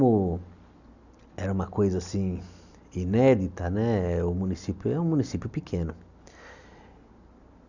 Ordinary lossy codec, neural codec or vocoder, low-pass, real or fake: none; none; 7.2 kHz; real